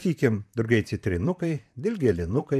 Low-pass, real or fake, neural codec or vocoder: 14.4 kHz; fake; vocoder, 44.1 kHz, 128 mel bands, Pupu-Vocoder